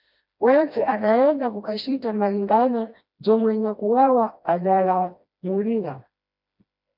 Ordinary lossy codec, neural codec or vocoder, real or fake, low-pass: MP3, 48 kbps; codec, 16 kHz, 1 kbps, FreqCodec, smaller model; fake; 5.4 kHz